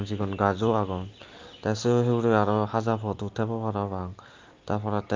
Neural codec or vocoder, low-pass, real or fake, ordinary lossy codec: none; 7.2 kHz; real; Opus, 32 kbps